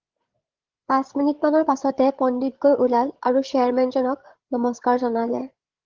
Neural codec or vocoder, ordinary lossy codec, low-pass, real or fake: codec, 16 kHz, 8 kbps, FreqCodec, larger model; Opus, 16 kbps; 7.2 kHz; fake